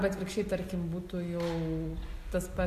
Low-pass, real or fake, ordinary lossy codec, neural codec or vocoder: 14.4 kHz; real; MP3, 64 kbps; none